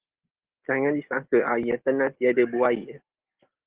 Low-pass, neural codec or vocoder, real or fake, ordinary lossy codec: 3.6 kHz; none; real; Opus, 16 kbps